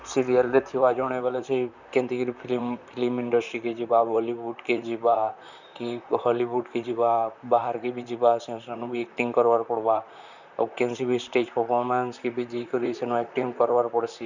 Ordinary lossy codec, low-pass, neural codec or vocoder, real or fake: none; 7.2 kHz; vocoder, 44.1 kHz, 128 mel bands, Pupu-Vocoder; fake